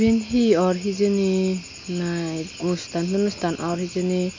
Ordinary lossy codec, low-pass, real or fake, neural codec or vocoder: AAC, 48 kbps; 7.2 kHz; real; none